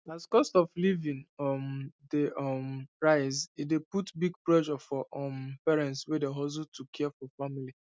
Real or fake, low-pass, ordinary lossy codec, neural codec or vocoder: real; none; none; none